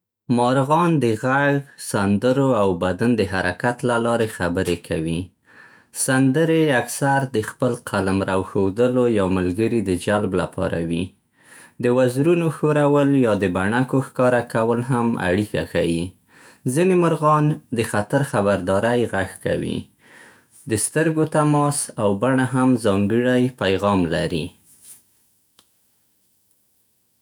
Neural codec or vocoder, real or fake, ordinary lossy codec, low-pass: autoencoder, 48 kHz, 128 numbers a frame, DAC-VAE, trained on Japanese speech; fake; none; none